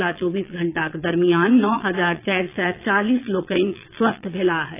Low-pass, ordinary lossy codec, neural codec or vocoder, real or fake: 3.6 kHz; AAC, 24 kbps; vocoder, 22.05 kHz, 80 mel bands, Vocos; fake